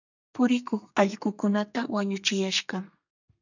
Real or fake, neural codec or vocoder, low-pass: fake; codec, 32 kHz, 1.9 kbps, SNAC; 7.2 kHz